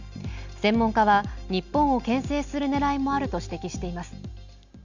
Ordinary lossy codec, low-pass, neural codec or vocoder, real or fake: none; 7.2 kHz; none; real